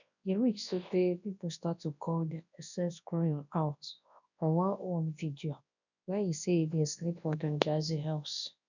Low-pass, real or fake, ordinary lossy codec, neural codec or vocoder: 7.2 kHz; fake; none; codec, 24 kHz, 0.9 kbps, WavTokenizer, large speech release